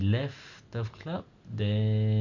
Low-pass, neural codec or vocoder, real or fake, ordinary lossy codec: 7.2 kHz; none; real; none